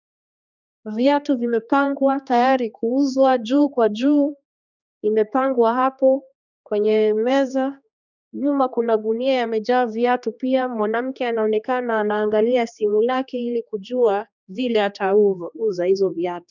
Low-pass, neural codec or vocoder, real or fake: 7.2 kHz; codec, 16 kHz, 2 kbps, X-Codec, HuBERT features, trained on general audio; fake